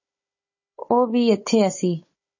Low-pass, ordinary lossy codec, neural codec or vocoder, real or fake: 7.2 kHz; MP3, 32 kbps; codec, 16 kHz, 16 kbps, FunCodec, trained on Chinese and English, 50 frames a second; fake